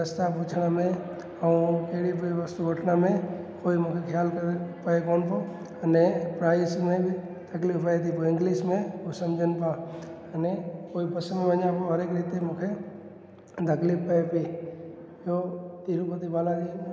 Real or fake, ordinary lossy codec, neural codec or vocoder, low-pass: real; none; none; none